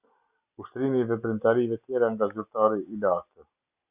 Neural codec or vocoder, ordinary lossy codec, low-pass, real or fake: none; Opus, 64 kbps; 3.6 kHz; real